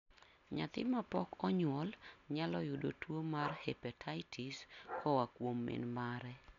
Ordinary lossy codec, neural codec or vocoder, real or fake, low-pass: none; none; real; 7.2 kHz